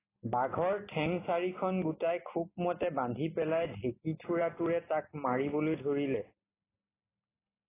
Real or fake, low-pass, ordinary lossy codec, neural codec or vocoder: real; 3.6 kHz; AAC, 16 kbps; none